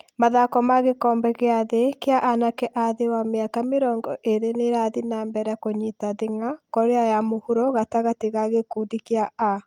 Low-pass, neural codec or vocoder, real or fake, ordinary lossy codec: 19.8 kHz; none; real; Opus, 32 kbps